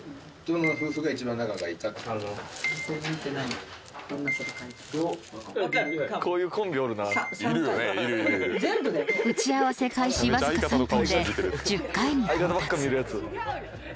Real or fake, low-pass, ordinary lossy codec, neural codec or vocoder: real; none; none; none